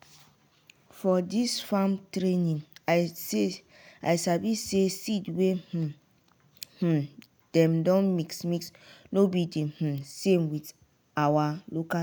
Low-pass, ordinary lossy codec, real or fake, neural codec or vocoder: none; none; real; none